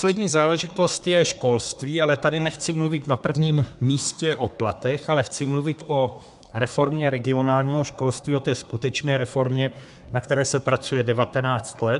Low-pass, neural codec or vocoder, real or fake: 10.8 kHz; codec, 24 kHz, 1 kbps, SNAC; fake